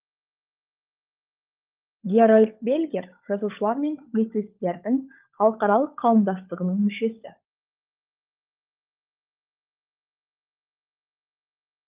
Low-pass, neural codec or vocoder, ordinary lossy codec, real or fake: 3.6 kHz; codec, 16 kHz, 16 kbps, FunCodec, trained on LibriTTS, 50 frames a second; Opus, 24 kbps; fake